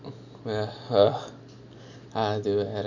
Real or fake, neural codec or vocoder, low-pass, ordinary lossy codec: real; none; 7.2 kHz; none